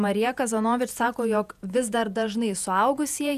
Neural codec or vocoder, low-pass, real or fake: vocoder, 48 kHz, 128 mel bands, Vocos; 14.4 kHz; fake